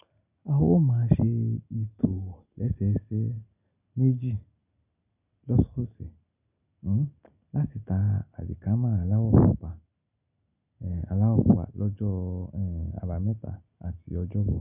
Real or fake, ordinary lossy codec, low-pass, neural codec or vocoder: real; none; 3.6 kHz; none